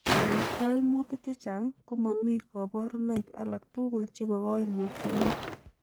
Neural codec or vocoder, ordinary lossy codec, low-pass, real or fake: codec, 44.1 kHz, 1.7 kbps, Pupu-Codec; none; none; fake